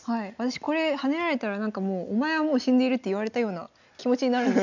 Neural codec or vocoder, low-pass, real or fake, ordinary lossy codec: none; 7.2 kHz; real; none